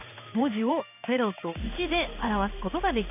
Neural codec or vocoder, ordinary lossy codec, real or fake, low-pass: codec, 16 kHz in and 24 kHz out, 1 kbps, XY-Tokenizer; none; fake; 3.6 kHz